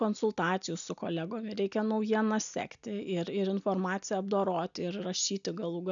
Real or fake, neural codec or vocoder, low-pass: real; none; 7.2 kHz